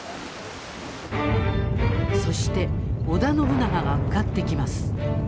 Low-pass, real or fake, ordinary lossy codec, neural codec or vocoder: none; real; none; none